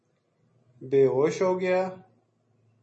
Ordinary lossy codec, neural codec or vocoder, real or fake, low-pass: MP3, 32 kbps; none; real; 10.8 kHz